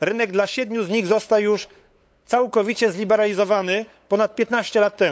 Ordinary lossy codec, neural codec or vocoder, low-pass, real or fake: none; codec, 16 kHz, 8 kbps, FunCodec, trained on LibriTTS, 25 frames a second; none; fake